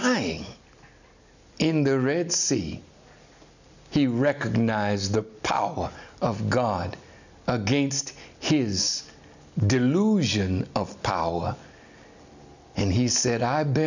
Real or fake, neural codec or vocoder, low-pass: real; none; 7.2 kHz